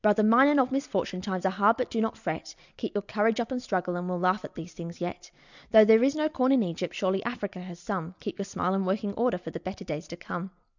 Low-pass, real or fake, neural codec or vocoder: 7.2 kHz; real; none